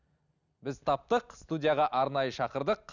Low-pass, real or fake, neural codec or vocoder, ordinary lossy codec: 7.2 kHz; real; none; none